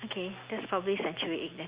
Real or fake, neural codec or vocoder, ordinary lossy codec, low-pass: real; none; Opus, 64 kbps; 3.6 kHz